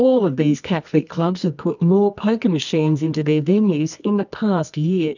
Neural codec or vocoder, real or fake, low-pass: codec, 24 kHz, 0.9 kbps, WavTokenizer, medium music audio release; fake; 7.2 kHz